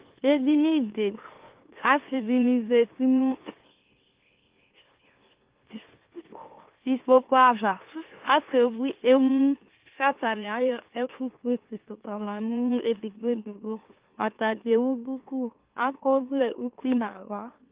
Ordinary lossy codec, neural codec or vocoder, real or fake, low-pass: Opus, 32 kbps; autoencoder, 44.1 kHz, a latent of 192 numbers a frame, MeloTTS; fake; 3.6 kHz